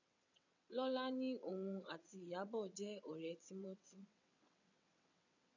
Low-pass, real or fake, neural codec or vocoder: 7.2 kHz; fake; vocoder, 22.05 kHz, 80 mel bands, WaveNeXt